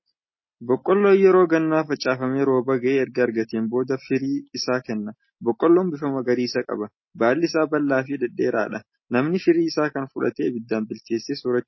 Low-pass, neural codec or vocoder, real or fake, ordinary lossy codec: 7.2 kHz; none; real; MP3, 24 kbps